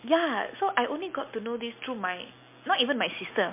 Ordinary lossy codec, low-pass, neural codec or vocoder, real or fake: MP3, 24 kbps; 3.6 kHz; none; real